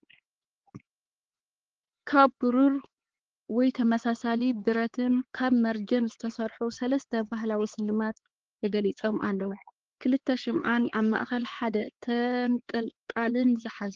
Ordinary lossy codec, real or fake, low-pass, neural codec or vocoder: Opus, 16 kbps; fake; 7.2 kHz; codec, 16 kHz, 4 kbps, X-Codec, HuBERT features, trained on LibriSpeech